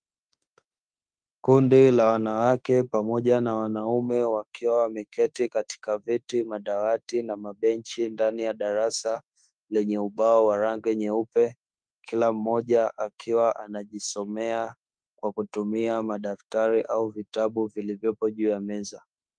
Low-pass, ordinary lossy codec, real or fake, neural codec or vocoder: 9.9 kHz; Opus, 32 kbps; fake; autoencoder, 48 kHz, 32 numbers a frame, DAC-VAE, trained on Japanese speech